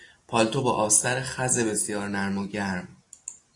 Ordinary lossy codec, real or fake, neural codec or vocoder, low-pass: AAC, 48 kbps; real; none; 10.8 kHz